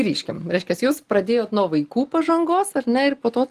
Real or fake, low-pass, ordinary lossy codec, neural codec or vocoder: real; 14.4 kHz; Opus, 24 kbps; none